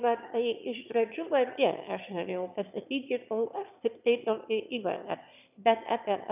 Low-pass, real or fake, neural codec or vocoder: 3.6 kHz; fake; autoencoder, 22.05 kHz, a latent of 192 numbers a frame, VITS, trained on one speaker